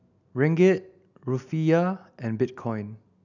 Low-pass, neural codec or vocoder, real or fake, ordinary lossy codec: 7.2 kHz; none; real; none